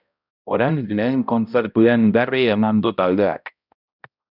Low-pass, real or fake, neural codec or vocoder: 5.4 kHz; fake; codec, 16 kHz, 0.5 kbps, X-Codec, HuBERT features, trained on balanced general audio